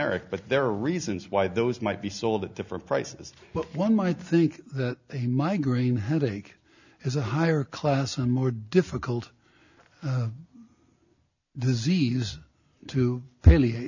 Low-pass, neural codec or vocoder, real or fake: 7.2 kHz; none; real